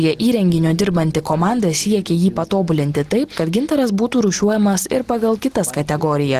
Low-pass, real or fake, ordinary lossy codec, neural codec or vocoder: 14.4 kHz; real; Opus, 16 kbps; none